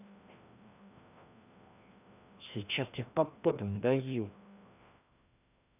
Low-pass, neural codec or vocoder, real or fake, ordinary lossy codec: 3.6 kHz; codec, 16 kHz, 1 kbps, FreqCodec, larger model; fake; none